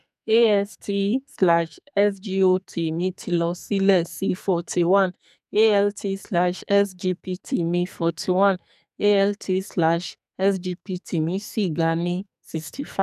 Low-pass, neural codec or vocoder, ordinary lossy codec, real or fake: 14.4 kHz; codec, 44.1 kHz, 2.6 kbps, SNAC; none; fake